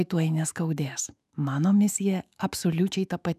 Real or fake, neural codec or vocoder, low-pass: fake; autoencoder, 48 kHz, 128 numbers a frame, DAC-VAE, trained on Japanese speech; 14.4 kHz